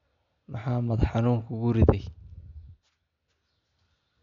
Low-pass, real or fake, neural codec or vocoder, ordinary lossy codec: 7.2 kHz; real; none; none